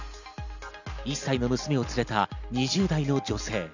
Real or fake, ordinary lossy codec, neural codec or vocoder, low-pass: real; none; none; 7.2 kHz